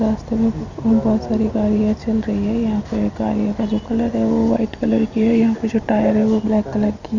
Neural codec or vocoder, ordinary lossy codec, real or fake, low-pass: none; none; real; 7.2 kHz